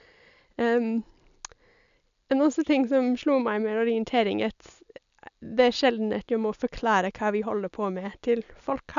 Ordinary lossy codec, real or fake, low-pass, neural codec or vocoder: none; real; 7.2 kHz; none